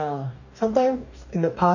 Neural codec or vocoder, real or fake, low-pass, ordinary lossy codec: codec, 44.1 kHz, 2.6 kbps, DAC; fake; 7.2 kHz; none